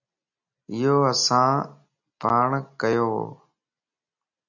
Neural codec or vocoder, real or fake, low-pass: none; real; 7.2 kHz